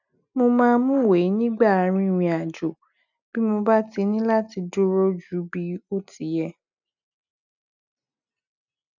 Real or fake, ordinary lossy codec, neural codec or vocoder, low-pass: real; none; none; 7.2 kHz